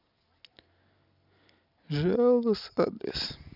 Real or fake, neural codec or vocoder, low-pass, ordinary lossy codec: real; none; 5.4 kHz; none